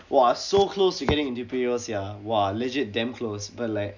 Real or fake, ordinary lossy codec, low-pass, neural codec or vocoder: real; none; 7.2 kHz; none